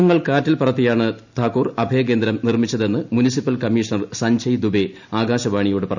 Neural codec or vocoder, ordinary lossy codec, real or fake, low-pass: none; none; real; 7.2 kHz